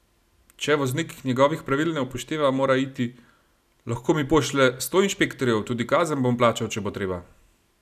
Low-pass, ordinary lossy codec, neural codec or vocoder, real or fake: 14.4 kHz; none; none; real